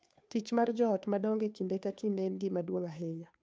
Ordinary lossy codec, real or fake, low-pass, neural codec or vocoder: none; fake; none; codec, 16 kHz, 2 kbps, FunCodec, trained on Chinese and English, 25 frames a second